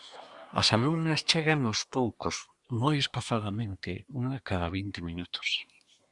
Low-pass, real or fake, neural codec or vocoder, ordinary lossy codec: 10.8 kHz; fake; codec, 24 kHz, 1 kbps, SNAC; Opus, 64 kbps